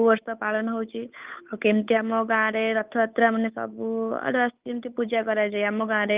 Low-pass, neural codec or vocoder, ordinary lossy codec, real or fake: 3.6 kHz; codec, 16 kHz, 8 kbps, FunCodec, trained on Chinese and English, 25 frames a second; Opus, 24 kbps; fake